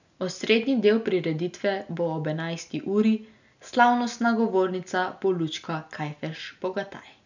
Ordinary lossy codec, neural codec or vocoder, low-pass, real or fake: none; none; 7.2 kHz; real